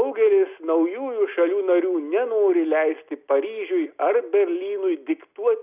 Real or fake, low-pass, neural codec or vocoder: real; 3.6 kHz; none